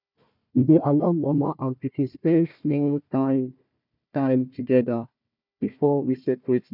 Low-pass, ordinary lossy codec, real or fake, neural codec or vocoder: 5.4 kHz; none; fake; codec, 16 kHz, 1 kbps, FunCodec, trained on Chinese and English, 50 frames a second